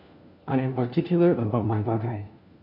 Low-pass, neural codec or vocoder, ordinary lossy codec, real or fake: 5.4 kHz; codec, 16 kHz, 1 kbps, FunCodec, trained on LibriTTS, 50 frames a second; MP3, 48 kbps; fake